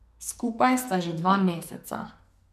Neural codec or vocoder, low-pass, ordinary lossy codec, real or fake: codec, 44.1 kHz, 2.6 kbps, SNAC; 14.4 kHz; none; fake